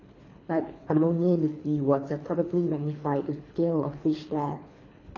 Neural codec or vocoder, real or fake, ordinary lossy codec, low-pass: codec, 24 kHz, 3 kbps, HILCodec; fake; AAC, 32 kbps; 7.2 kHz